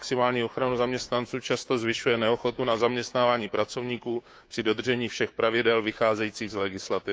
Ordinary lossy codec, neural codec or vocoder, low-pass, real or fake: none; codec, 16 kHz, 6 kbps, DAC; none; fake